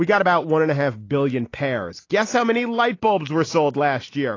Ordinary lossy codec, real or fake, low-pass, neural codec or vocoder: AAC, 32 kbps; real; 7.2 kHz; none